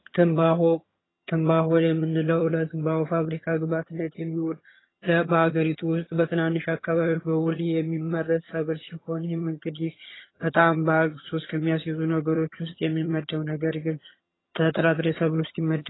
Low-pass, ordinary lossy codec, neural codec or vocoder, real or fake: 7.2 kHz; AAC, 16 kbps; vocoder, 22.05 kHz, 80 mel bands, HiFi-GAN; fake